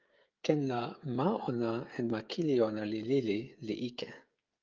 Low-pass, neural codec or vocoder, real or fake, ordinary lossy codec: 7.2 kHz; codec, 16 kHz, 16 kbps, FreqCodec, smaller model; fake; Opus, 24 kbps